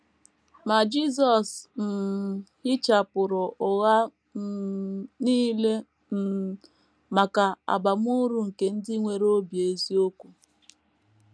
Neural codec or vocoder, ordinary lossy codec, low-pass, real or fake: none; none; none; real